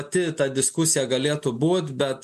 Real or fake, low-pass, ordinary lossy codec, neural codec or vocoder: real; 14.4 kHz; MP3, 64 kbps; none